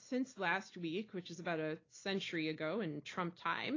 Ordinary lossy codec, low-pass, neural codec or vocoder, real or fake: AAC, 32 kbps; 7.2 kHz; none; real